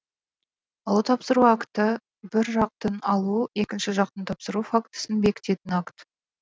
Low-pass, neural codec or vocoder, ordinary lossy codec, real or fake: none; none; none; real